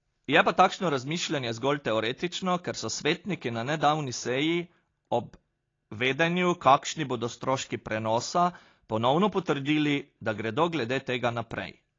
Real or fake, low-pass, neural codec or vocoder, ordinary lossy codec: real; 7.2 kHz; none; AAC, 32 kbps